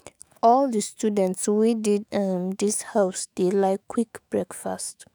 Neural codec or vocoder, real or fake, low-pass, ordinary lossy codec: autoencoder, 48 kHz, 128 numbers a frame, DAC-VAE, trained on Japanese speech; fake; none; none